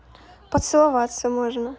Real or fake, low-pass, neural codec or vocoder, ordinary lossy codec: real; none; none; none